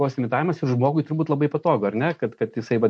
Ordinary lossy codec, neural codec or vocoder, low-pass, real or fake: MP3, 48 kbps; none; 9.9 kHz; real